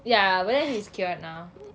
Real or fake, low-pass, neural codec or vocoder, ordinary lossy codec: real; none; none; none